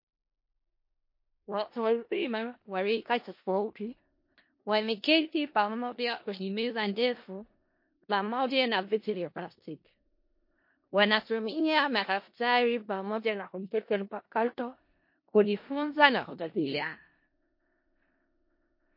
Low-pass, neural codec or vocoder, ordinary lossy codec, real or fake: 5.4 kHz; codec, 16 kHz in and 24 kHz out, 0.4 kbps, LongCat-Audio-Codec, four codebook decoder; MP3, 32 kbps; fake